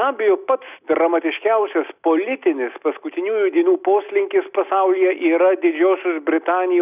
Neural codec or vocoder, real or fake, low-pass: none; real; 3.6 kHz